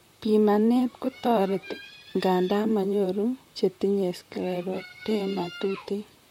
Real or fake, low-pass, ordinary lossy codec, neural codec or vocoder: fake; 19.8 kHz; MP3, 64 kbps; vocoder, 44.1 kHz, 128 mel bands, Pupu-Vocoder